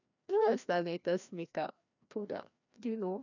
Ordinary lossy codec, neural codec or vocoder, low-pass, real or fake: none; codec, 16 kHz, 1 kbps, FreqCodec, larger model; 7.2 kHz; fake